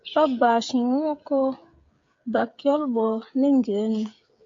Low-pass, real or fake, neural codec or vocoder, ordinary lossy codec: 7.2 kHz; fake; codec, 16 kHz, 8 kbps, FreqCodec, smaller model; MP3, 48 kbps